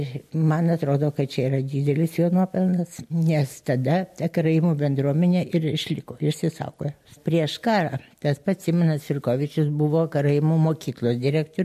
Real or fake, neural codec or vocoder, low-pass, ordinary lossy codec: real; none; 14.4 kHz; MP3, 64 kbps